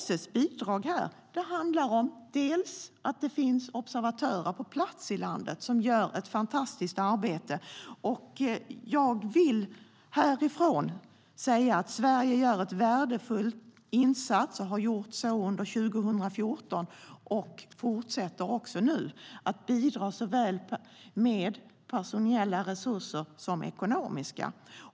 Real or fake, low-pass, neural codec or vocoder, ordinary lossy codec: real; none; none; none